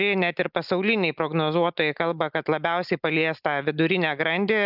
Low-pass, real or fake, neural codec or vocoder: 5.4 kHz; real; none